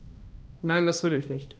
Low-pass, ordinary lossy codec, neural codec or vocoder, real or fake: none; none; codec, 16 kHz, 1 kbps, X-Codec, HuBERT features, trained on balanced general audio; fake